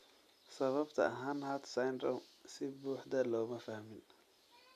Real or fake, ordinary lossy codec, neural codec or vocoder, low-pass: real; none; none; 14.4 kHz